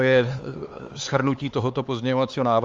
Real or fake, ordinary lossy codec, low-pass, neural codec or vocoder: fake; Opus, 32 kbps; 7.2 kHz; codec, 16 kHz, 4 kbps, X-Codec, HuBERT features, trained on LibriSpeech